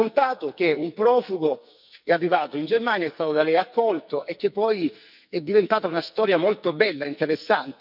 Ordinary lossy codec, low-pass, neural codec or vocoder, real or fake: none; 5.4 kHz; codec, 44.1 kHz, 2.6 kbps, SNAC; fake